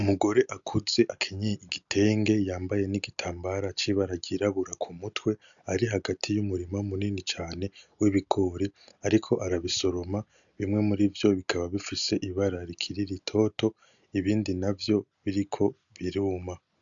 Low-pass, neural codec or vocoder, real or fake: 7.2 kHz; none; real